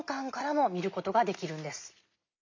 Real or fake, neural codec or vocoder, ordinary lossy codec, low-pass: real; none; MP3, 48 kbps; 7.2 kHz